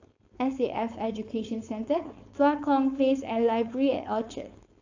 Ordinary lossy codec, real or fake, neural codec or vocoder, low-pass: AAC, 48 kbps; fake; codec, 16 kHz, 4.8 kbps, FACodec; 7.2 kHz